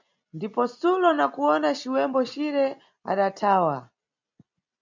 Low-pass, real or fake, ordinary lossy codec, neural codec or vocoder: 7.2 kHz; real; MP3, 64 kbps; none